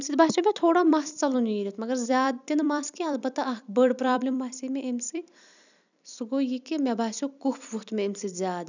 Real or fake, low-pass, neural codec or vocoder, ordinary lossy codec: fake; 7.2 kHz; vocoder, 44.1 kHz, 128 mel bands every 256 samples, BigVGAN v2; none